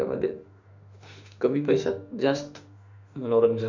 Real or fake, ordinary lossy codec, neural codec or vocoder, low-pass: fake; none; autoencoder, 48 kHz, 32 numbers a frame, DAC-VAE, trained on Japanese speech; 7.2 kHz